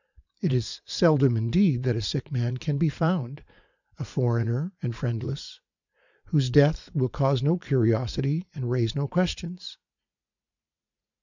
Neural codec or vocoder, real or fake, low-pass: vocoder, 44.1 kHz, 80 mel bands, Vocos; fake; 7.2 kHz